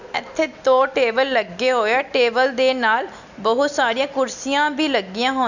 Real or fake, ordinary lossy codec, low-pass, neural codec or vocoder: real; none; 7.2 kHz; none